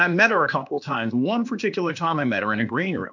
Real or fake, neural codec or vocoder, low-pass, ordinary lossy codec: fake; codec, 16 kHz, 0.8 kbps, ZipCodec; 7.2 kHz; MP3, 64 kbps